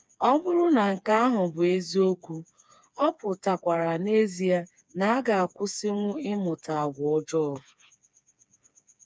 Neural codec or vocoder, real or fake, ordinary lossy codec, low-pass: codec, 16 kHz, 4 kbps, FreqCodec, smaller model; fake; none; none